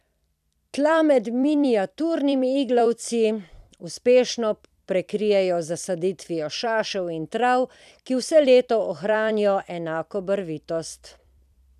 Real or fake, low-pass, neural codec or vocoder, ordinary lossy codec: fake; 14.4 kHz; vocoder, 44.1 kHz, 128 mel bands every 256 samples, BigVGAN v2; none